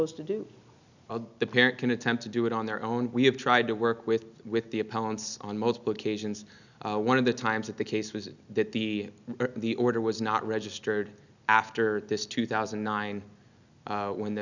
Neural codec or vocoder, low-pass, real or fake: none; 7.2 kHz; real